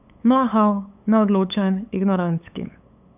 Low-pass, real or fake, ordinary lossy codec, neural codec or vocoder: 3.6 kHz; fake; none; codec, 16 kHz, 8 kbps, FunCodec, trained on LibriTTS, 25 frames a second